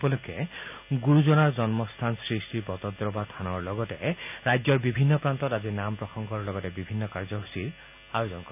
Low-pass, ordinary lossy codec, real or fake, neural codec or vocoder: 3.6 kHz; none; real; none